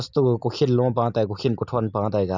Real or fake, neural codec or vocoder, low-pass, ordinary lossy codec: real; none; 7.2 kHz; none